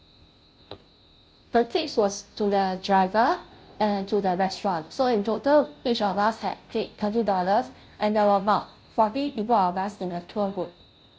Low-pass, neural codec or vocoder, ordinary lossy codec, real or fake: none; codec, 16 kHz, 0.5 kbps, FunCodec, trained on Chinese and English, 25 frames a second; none; fake